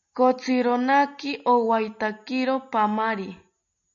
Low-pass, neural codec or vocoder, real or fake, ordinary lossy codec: 7.2 kHz; none; real; MP3, 96 kbps